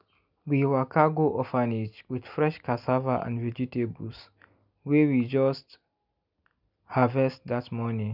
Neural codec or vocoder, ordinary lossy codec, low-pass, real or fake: none; none; 5.4 kHz; real